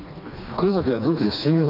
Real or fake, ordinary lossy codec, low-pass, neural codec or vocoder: fake; none; 5.4 kHz; codec, 16 kHz, 2 kbps, FreqCodec, smaller model